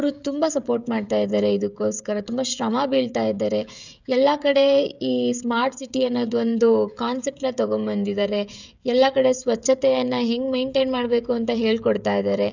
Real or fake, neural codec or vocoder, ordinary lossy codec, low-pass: fake; codec, 16 kHz, 16 kbps, FreqCodec, smaller model; none; 7.2 kHz